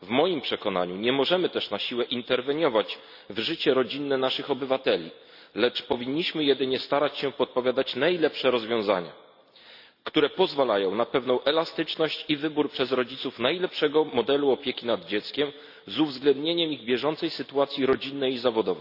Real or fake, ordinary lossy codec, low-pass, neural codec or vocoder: real; none; 5.4 kHz; none